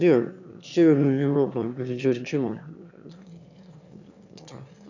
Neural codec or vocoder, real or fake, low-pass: autoencoder, 22.05 kHz, a latent of 192 numbers a frame, VITS, trained on one speaker; fake; 7.2 kHz